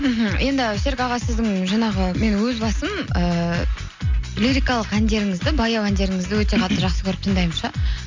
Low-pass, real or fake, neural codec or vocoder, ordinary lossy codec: 7.2 kHz; real; none; MP3, 64 kbps